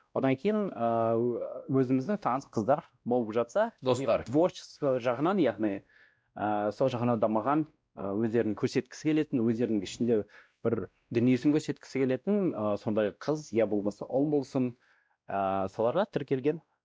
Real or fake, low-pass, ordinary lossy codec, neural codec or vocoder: fake; none; none; codec, 16 kHz, 1 kbps, X-Codec, WavLM features, trained on Multilingual LibriSpeech